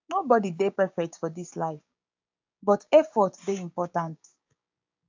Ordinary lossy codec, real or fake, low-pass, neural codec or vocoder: AAC, 48 kbps; fake; 7.2 kHz; codec, 16 kHz, 6 kbps, DAC